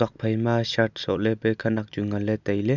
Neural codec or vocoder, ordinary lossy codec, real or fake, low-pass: none; none; real; 7.2 kHz